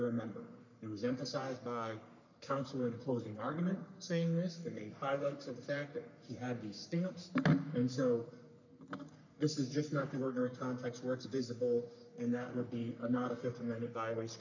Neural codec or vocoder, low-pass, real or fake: codec, 44.1 kHz, 3.4 kbps, Pupu-Codec; 7.2 kHz; fake